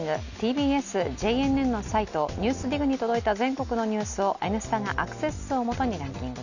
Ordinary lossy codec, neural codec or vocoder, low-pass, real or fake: none; none; 7.2 kHz; real